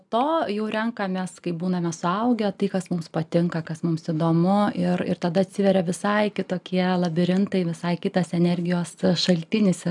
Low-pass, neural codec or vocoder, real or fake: 10.8 kHz; none; real